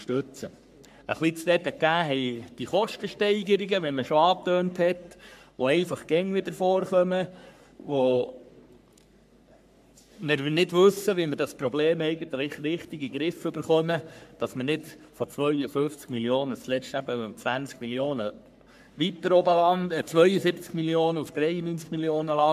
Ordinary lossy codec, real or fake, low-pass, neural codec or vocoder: MP3, 96 kbps; fake; 14.4 kHz; codec, 44.1 kHz, 3.4 kbps, Pupu-Codec